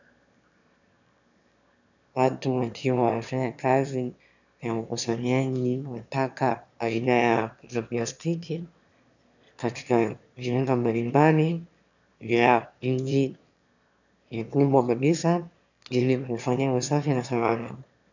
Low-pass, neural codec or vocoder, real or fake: 7.2 kHz; autoencoder, 22.05 kHz, a latent of 192 numbers a frame, VITS, trained on one speaker; fake